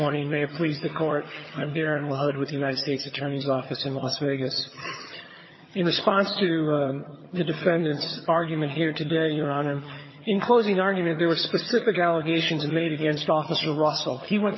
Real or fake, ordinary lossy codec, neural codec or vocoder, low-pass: fake; MP3, 24 kbps; vocoder, 22.05 kHz, 80 mel bands, HiFi-GAN; 7.2 kHz